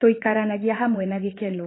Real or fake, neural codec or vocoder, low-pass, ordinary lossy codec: fake; vocoder, 24 kHz, 100 mel bands, Vocos; 7.2 kHz; AAC, 16 kbps